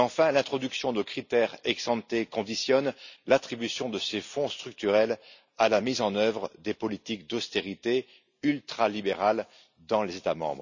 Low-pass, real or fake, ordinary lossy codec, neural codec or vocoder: 7.2 kHz; real; none; none